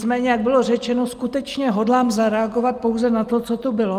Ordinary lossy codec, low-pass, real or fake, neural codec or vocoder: Opus, 32 kbps; 14.4 kHz; real; none